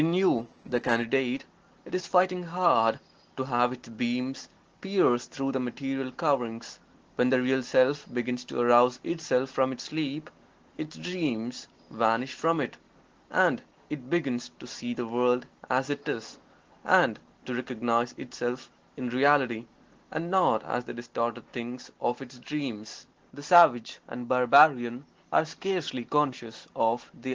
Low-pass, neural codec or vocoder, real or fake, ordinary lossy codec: 7.2 kHz; none; real; Opus, 16 kbps